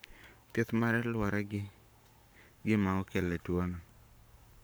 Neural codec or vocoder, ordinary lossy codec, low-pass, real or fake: codec, 44.1 kHz, 7.8 kbps, Pupu-Codec; none; none; fake